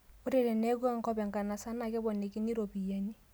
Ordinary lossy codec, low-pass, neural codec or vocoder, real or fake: none; none; none; real